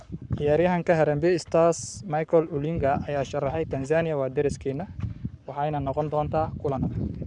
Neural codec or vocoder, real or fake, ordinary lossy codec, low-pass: codec, 44.1 kHz, 7.8 kbps, Pupu-Codec; fake; none; 10.8 kHz